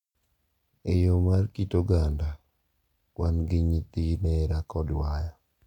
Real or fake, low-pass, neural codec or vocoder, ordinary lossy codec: real; 19.8 kHz; none; none